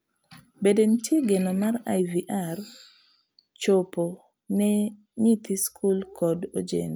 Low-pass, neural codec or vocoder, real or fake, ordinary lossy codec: none; none; real; none